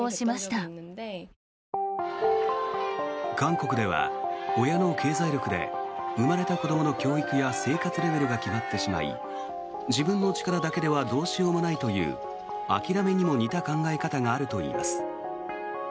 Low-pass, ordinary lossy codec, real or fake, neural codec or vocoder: none; none; real; none